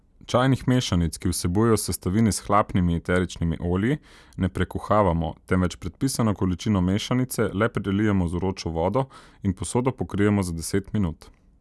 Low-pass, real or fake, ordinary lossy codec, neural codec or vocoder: none; real; none; none